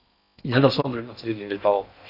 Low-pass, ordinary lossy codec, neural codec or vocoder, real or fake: 5.4 kHz; none; codec, 16 kHz in and 24 kHz out, 0.6 kbps, FocalCodec, streaming, 4096 codes; fake